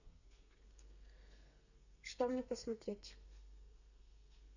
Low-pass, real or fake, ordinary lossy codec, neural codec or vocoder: 7.2 kHz; fake; none; codec, 32 kHz, 1.9 kbps, SNAC